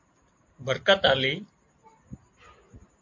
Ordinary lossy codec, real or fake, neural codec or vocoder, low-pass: MP3, 48 kbps; real; none; 7.2 kHz